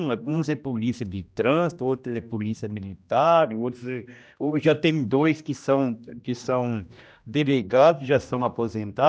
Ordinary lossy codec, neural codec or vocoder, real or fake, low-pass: none; codec, 16 kHz, 1 kbps, X-Codec, HuBERT features, trained on general audio; fake; none